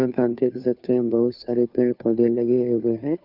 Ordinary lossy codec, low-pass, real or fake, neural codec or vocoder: none; 5.4 kHz; fake; codec, 16 kHz, 2 kbps, FunCodec, trained on Chinese and English, 25 frames a second